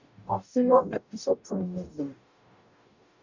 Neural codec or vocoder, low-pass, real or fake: codec, 44.1 kHz, 0.9 kbps, DAC; 7.2 kHz; fake